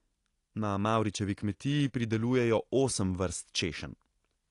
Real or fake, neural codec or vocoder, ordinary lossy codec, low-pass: real; none; AAC, 48 kbps; 10.8 kHz